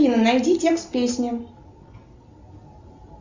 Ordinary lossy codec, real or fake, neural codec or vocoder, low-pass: Opus, 64 kbps; real; none; 7.2 kHz